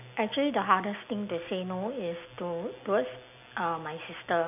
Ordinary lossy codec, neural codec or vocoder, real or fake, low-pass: none; none; real; 3.6 kHz